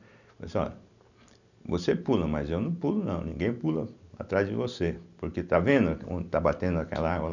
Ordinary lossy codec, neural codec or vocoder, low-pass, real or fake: none; none; 7.2 kHz; real